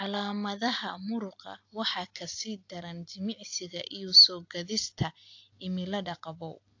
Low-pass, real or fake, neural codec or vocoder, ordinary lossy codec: 7.2 kHz; real; none; AAC, 48 kbps